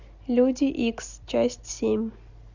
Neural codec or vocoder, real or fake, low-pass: none; real; 7.2 kHz